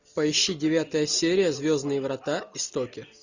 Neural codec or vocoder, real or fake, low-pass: none; real; 7.2 kHz